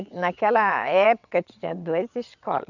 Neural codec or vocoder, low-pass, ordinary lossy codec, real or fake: vocoder, 22.05 kHz, 80 mel bands, WaveNeXt; 7.2 kHz; none; fake